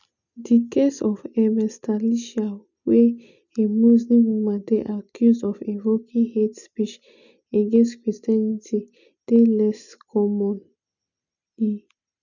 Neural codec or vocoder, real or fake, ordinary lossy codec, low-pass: none; real; none; 7.2 kHz